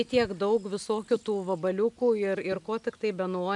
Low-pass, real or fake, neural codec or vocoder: 10.8 kHz; real; none